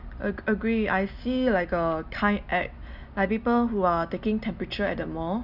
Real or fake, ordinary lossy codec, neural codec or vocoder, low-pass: real; none; none; 5.4 kHz